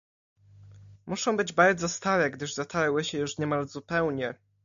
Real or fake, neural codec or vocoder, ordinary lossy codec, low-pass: real; none; AAC, 64 kbps; 7.2 kHz